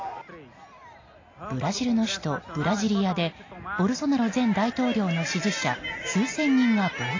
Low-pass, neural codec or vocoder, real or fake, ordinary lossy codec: 7.2 kHz; none; real; AAC, 32 kbps